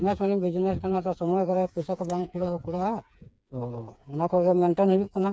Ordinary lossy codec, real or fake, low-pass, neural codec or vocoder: none; fake; none; codec, 16 kHz, 4 kbps, FreqCodec, smaller model